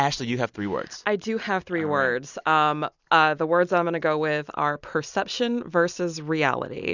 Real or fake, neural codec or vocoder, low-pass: real; none; 7.2 kHz